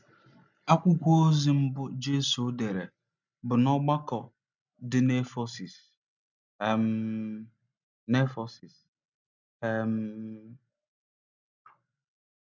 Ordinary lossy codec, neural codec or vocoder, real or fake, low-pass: none; none; real; 7.2 kHz